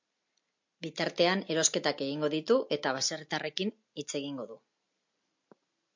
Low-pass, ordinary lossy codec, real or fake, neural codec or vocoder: 7.2 kHz; MP3, 48 kbps; real; none